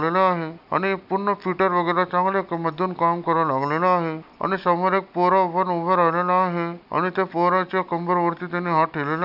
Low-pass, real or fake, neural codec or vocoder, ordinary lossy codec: 5.4 kHz; real; none; none